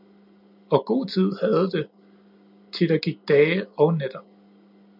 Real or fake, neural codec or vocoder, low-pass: real; none; 5.4 kHz